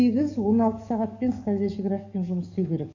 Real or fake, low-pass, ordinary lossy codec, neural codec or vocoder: fake; 7.2 kHz; none; codec, 44.1 kHz, 7.8 kbps, DAC